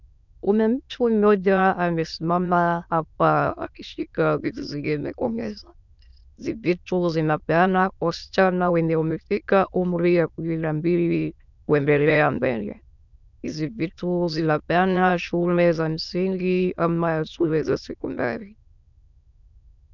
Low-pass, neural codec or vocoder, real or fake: 7.2 kHz; autoencoder, 22.05 kHz, a latent of 192 numbers a frame, VITS, trained on many speakers; fake